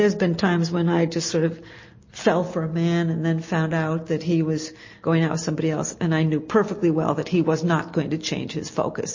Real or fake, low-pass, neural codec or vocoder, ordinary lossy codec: real; 7.2 kHz; none; MP3, 32 kbps